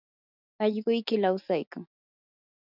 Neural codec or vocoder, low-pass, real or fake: none; 5.4 kHz; real